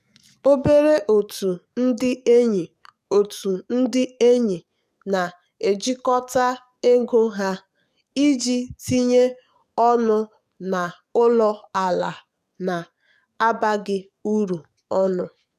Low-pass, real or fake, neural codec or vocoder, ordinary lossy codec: 14.4 kHz; fake; codec, 44.1 kHz, 7.8 kbps, DAC; none